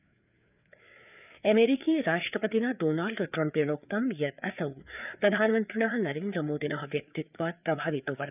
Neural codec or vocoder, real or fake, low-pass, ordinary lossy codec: codec, 16 kHz, 4 kbps, FreqCodec, larger model; fake; 3.6 kHz; none